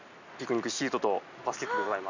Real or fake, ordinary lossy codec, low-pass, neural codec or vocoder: real; none; 7.2 kHz; none